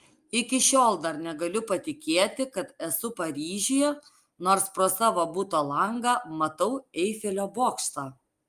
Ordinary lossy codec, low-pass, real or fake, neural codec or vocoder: Opus, 32 kbps; 14.4 kHz; real; none